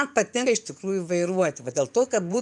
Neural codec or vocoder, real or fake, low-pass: vocoder, 24 kHz, 100 mel bands, Vocos; fake; 10.8 kHz